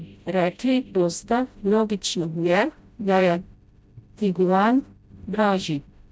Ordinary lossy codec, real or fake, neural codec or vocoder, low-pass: none; fake; codec, 16 kHz, 0.5 kbps, FreqCodec, smaller model; none